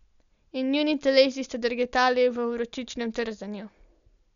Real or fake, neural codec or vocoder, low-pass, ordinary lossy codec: real; none; 7.2 kHz; none